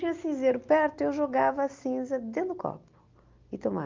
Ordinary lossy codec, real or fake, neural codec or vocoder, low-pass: Opus, 32 kbps; real; none; 7.2 kHz